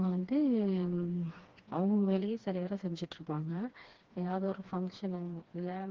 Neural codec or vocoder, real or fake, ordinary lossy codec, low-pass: codec, 16 kHz, 2 kbps, FreqCodec, smaller model; fake; Opus, 16 kbps; 7.2 kHz